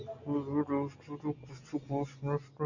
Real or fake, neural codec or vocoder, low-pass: real; none; 7.2 kHz